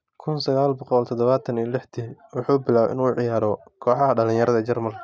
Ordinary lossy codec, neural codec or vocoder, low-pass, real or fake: none; none; none; real